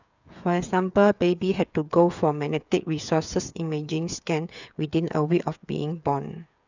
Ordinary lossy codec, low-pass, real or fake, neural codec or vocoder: none; 7.2 kHz; fake; codec, 16 kHz, 8 kbps, FreqCodec, smaller model